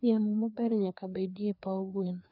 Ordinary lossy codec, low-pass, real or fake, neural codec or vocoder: none; 5.4 kHz; fake; codec, 16 kHz, 2 kbps, FreqCodec, larger model